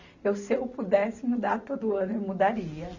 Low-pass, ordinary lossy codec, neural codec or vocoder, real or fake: 7.2 kHz; none; none; real